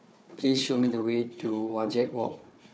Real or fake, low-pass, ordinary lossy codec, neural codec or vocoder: fake; none; none; codec, 16 kHz, 4 kbps, FunCodec, trained on Chinese and English, 50 frames a second